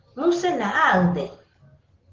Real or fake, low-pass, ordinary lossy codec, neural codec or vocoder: real; 7.2 kHz; Opus, 16 kbps; none